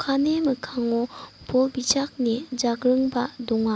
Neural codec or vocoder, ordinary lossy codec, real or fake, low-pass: none; none; real; none